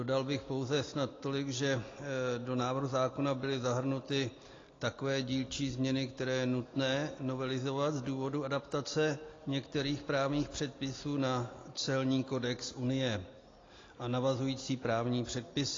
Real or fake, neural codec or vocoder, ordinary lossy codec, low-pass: real; none; AAC, 32 kbps; 7.2 kHz